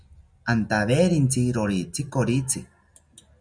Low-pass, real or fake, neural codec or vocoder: 9.9 kHz; real; none